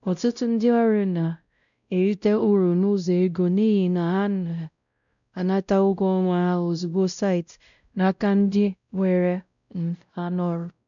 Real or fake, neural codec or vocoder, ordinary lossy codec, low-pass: fake; codec, 16 kHz, 0.5 kbps, X-Codec, WavLM features, trained on Multilingual LibriSpeech; none; 7.2 kHz